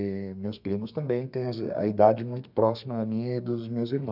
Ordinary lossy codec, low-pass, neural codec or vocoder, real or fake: none; 5.4 kHz; codec, 32 kHz, 1.9 kbps, SNAC; fake